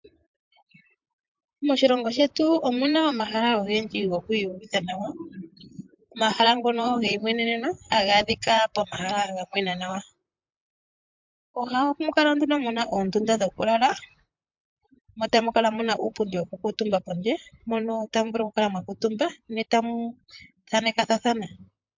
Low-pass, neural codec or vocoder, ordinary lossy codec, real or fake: 7.2 kHz; vocoder, 44.1 kHz, 128 mel bands, Pupu-Vocoder; MP3, 64 kbps; fake